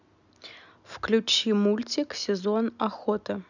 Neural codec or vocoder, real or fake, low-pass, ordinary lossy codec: none; real; 7.2 kHz; none